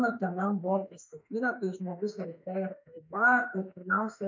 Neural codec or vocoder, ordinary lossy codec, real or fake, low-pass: autoencoder, 48 kHz, 32 numbers a frame, DAC-VAE, trained on Japanese speech; MP3, 64 kbps; fake; 7.2 kHz